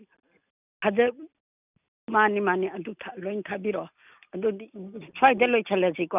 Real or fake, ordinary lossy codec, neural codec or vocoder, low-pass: real; none; none; 3.6 kHz